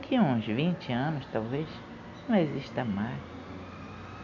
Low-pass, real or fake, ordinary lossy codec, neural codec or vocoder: 7.2 kHz; real; none; none